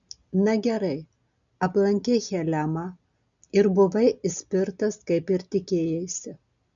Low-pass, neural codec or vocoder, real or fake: 7.2 kHz; none; real